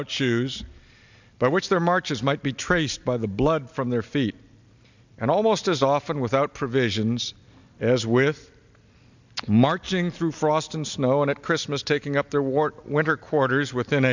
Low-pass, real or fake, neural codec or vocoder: 7.2 kHz; real; none